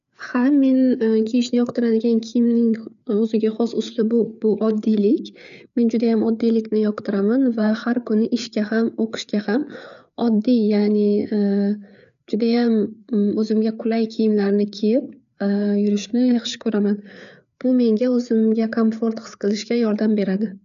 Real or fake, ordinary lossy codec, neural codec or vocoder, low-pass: fake; none; codec, 16 kHz, 4 kbps, FreqCodec, larger model; 7.2 kHz